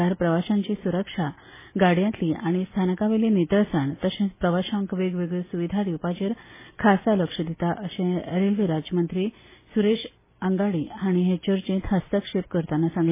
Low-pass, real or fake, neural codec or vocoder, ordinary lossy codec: 3.6 kHz; real; none; MP3, 16 kbps